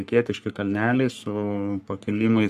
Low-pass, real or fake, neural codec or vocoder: 14.4 kHz; fake; codec, 44.1 kHz, 3.4 kbps, Pupu-Codec